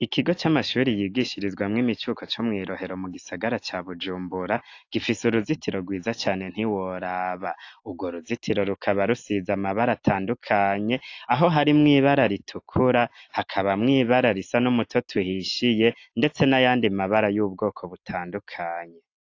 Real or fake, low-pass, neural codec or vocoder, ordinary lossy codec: real; 7.2 kHz; none; AAC, 48 kbps